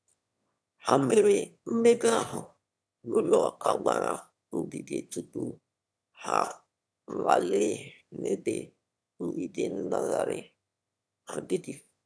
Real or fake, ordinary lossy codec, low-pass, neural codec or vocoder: fake; none; none; autoencoder, 22.05 kHz, a latent of 192 numbers a frame, VITS, trained on one speaker